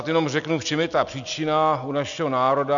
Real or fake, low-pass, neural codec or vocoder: real; 7.2 kHz; none